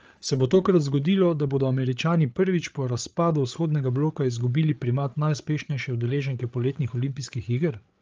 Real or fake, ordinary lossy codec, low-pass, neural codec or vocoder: fake; Opus, 24 kbps; 7.2 kHz; codec, 16 kHz, 8 kbps, FreqCodec, larger model